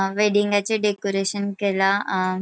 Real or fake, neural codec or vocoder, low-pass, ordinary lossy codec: real; none; none; none